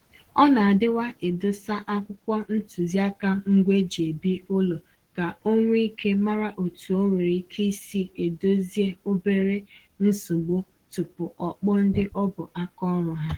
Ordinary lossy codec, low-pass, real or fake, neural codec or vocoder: Opus, 16 kbps; 19.8 kHz; fake; codec, 44.1 kHz, 7.8 kbps, Pupu-Codec